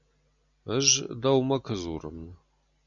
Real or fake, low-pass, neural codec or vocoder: real; 7.2 kHz; none